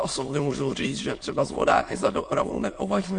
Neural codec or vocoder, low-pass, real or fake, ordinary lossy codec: autoencoder, 22.05 kHz, a latent of 192 numbers a frame, VITS, trained on many speakers; 9.9 kHz; fake; MP3, 48 kbps